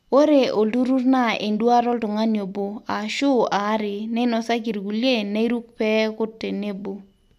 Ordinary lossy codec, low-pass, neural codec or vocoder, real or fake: none; 14.4 kHz; none; real